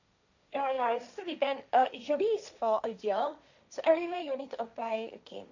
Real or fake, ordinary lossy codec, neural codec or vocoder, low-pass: fake; none; codec, 16 kHz, 1.1 kbps, Voila-Tokenizer; 7.2 kHz